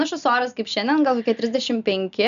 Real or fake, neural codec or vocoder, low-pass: real; none; 7.2 kHz